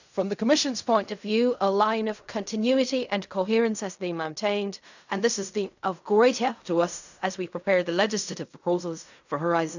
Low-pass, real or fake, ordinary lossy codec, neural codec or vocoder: 7.2 kHz; fake; none; codec, 16 kHz in and 24 kHz out, 0.4 kbps, LongCat-Audio-Codec, fine tuned four codebook decoder